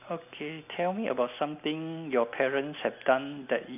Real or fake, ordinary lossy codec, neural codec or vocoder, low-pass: real; none; none; 3.6 kHz